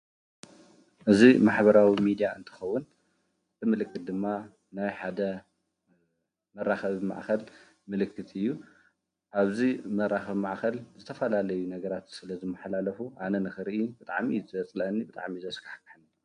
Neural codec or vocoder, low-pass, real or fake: none; 9.9 kHz; real